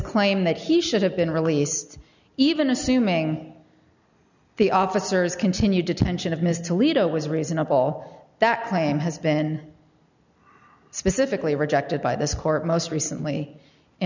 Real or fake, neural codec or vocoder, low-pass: real; none; 7.2 kHz